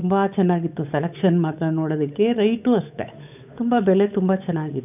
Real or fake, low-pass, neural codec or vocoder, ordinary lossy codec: fake; 3.6 kHz; codec, 16 kHz, 8 kbps, FunCodec, trained on Chinese and English, 25 frames a second; none